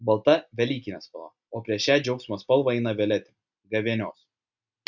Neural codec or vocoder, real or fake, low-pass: none; real; 7.2 kHz